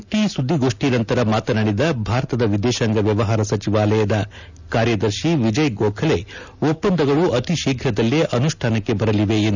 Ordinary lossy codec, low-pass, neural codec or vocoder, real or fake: none; 7.2 kHz; none; real